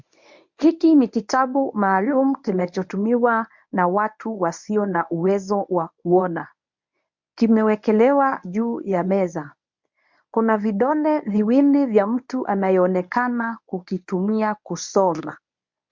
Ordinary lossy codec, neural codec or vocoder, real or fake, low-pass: MP3, 64 kbps; codec, 24 kHz, 0.9 kbps, WavTokenizer, medium speech release version 1; fake; 7.2 kHz